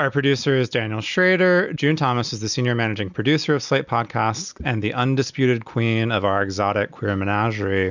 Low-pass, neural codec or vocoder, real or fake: 7.2 kHz; none; real